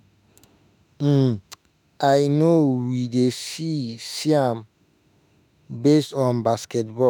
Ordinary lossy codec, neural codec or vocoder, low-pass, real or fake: none; autoencoder, 48 kHz, 32 numbers a frame, DAC-VAE, trained on Japanese speech; none; fake